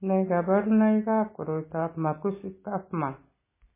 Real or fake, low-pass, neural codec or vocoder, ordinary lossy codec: real; 3.6 kHz; none; MP3, 16 kbps